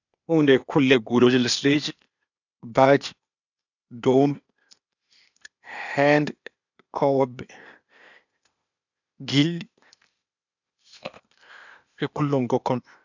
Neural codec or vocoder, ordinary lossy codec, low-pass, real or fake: codec, 16 kHz, 0.8 kbps, ZipCodec; none; 7.2 kHz; fake